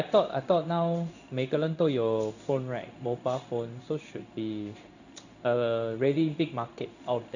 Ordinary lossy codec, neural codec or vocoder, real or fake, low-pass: none; codec, 16 kHz in and 24 kHz out, 1 kbps, XY-Tokenizer; fake; 7.2 kHz